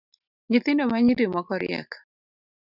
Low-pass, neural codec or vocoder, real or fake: 5.4 kHz; none; real